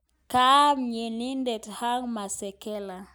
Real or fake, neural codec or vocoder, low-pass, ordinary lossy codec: real; none; none; none